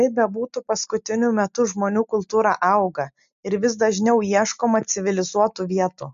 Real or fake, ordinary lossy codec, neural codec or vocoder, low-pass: real; MP3, 64 kbps; none; 7.2 kHz